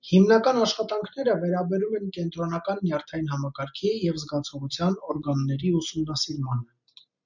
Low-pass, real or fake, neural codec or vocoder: 7.2 kHz; real; none